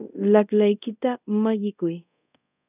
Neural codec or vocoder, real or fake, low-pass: codec, 24 kHz, 0.5 kbps, DualCodec; fake; 3.6 kHz